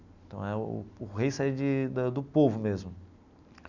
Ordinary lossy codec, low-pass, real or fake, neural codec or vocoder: none; 7.2 kHz; real; none